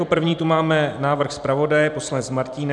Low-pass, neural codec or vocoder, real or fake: 10.8 kHz; vocoder, 44.1 kHz, 128 mel bands every 256 samples, BigVGAN v2; fake